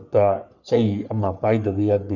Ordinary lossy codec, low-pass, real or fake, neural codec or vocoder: none; 7.2 kHz; fake; codec, 44.1 kHz, 3.4 kbps, Pupu-Codec